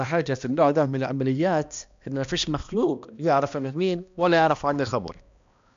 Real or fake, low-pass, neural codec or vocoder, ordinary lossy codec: fake; 7.2 kHz; codec, 16 kHz, 1 kbps, X-Codec, HuBERT features, trained on balanced general audio; MP3, 64 kbps